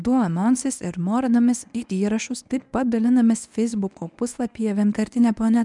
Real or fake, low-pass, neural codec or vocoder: fake; 10.8 kHz; codec, 24 kHz, 0.9 kbps, WavTokenizer, medium speech release version 1